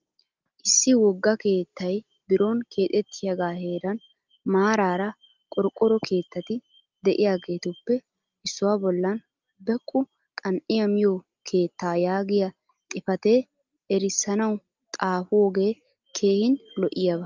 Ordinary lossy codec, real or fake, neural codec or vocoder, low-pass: Opus, 24 kbps; real; none; 7.2 kHz